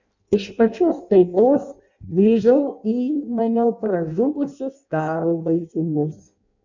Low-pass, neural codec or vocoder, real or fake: 7.2 kHz; codec, 16 kHz in and 24 kHz out, 0.6 kbps, FireRedTTS-2 codec; fake